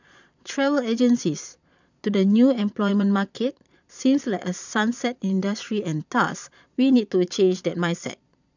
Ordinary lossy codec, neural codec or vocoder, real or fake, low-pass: none; vocoder, 22.05 kHz, 80 mel bands, Vocos; fake; 7.2 kHz